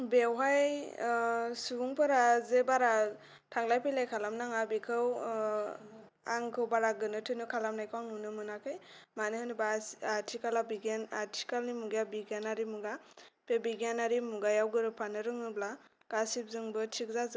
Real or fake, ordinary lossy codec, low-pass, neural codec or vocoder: real; none; none; none